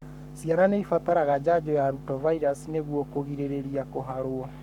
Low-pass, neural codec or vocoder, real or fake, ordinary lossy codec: 19.8 kHz; codec, 44.1 kHz, 7.8 kbps, Pupu-Codec; fake; none